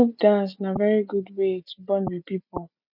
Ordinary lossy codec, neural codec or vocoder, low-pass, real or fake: none; none; 5.4 kHz; real